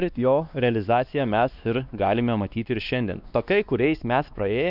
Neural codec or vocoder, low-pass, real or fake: codec, 16 kHz, 2 kbps, X-Codec, WavLM features, trained on Multilingual LibriSpeech; 5.4 kHz; fake